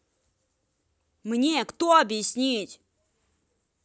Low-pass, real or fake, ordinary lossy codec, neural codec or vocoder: none; real; none; none